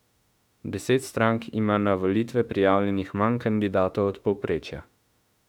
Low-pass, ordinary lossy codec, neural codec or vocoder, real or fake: 19.8 kHz; none; autoencoder, 48 kHz, 32 numbers a frame, DAC-VAE, trained on Japanese speech; fake